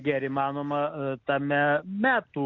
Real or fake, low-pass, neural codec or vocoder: real; 7.2 kHz; none